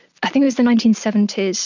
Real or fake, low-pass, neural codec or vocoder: real; 7.2 kHz; none